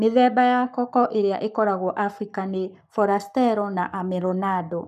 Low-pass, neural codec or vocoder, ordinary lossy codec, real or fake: 14.4 kHz; codec, 44.1 kHz, 7.8 kbps, Pupu-Codec; none; fake